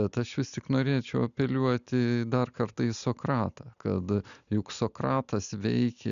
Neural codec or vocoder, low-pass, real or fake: none; 7.2 kHz; real